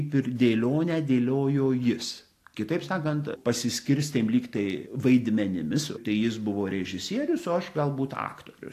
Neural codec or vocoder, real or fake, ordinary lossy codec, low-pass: none; real; AAC, 64 kbps; 14.4 kHz